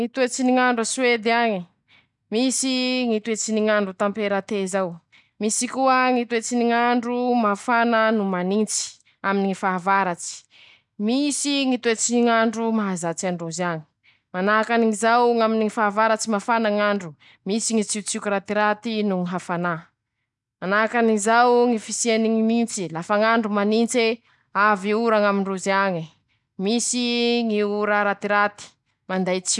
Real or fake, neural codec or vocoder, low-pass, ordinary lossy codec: real; none; 10.8 kHz; none